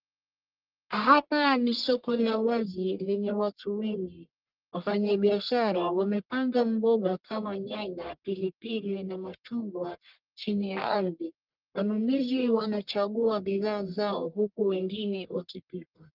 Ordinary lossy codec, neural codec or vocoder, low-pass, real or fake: Opus, 32 kbps; codec, 44.1 kHz, 1.7 kbps, Pupu-Codec; 5.4 kHz; fake